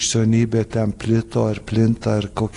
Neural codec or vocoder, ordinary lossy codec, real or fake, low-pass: none; AAC, 48 kbps; real; 10.8 kHz